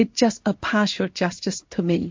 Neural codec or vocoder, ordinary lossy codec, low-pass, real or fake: codec, 16 kHz in and 24 kHz out, 1 kbps, XY-Tokenizer; MP3, 48 kbps; 7.2 kHz; fake